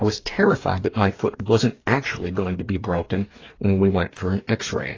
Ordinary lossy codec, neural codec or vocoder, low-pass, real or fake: AAC, 32 kbps; codec, 44.1 kHz, 2.6 kbps, DAC; 7.2 kHz; fake